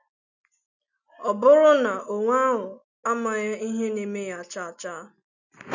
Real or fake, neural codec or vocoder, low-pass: real; none; 7.2 kHz